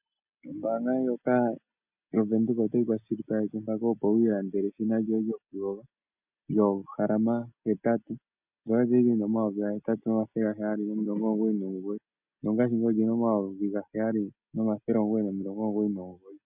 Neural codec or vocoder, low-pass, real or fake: none; 3.6 kHz; real